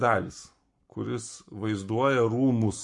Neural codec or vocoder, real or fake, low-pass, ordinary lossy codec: codec, 44.1 kHz, 7.8 kbps, Pupu-Codec; fake; 10.8 kHz; MP3, 48 kbps